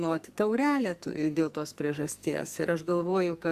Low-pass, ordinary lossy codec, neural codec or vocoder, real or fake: 14.4 kHz; Opus, 64 kbps; codec, 44.1 kHz, 2.6 kbps, SNAC; fake